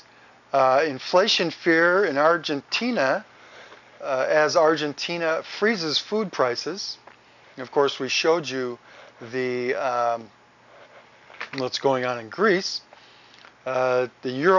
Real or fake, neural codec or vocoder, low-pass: real; none; 7.2 kHz